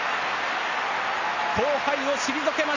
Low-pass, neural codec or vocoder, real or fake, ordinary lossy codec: 7.2 kHz; none; real; Opus, 64 kbps